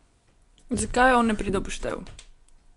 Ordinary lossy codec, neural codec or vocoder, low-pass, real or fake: none; vocoder, 24 kHz, 100 mel bands, Vocos; 10.8 kHz; fake